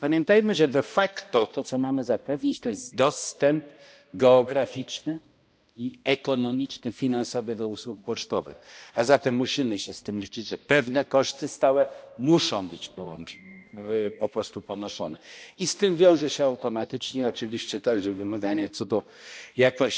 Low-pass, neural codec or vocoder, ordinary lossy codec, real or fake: none; codec, 16 kHz, 1 kbps, X-Codec, HuBERT features, trained on balanced general audio; none; fake